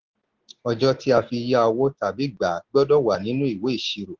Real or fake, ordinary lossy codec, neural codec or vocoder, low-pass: real; Opus, 16 kbps; none; 7.2 kHz